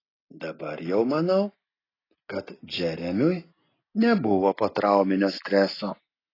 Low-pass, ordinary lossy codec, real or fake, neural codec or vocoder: 5.4 kHz; AAC, 24 kbps; real; none